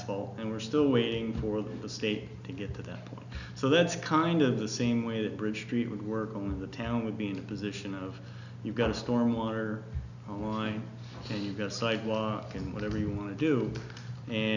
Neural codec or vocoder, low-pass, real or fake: none; 7.2 kHz; real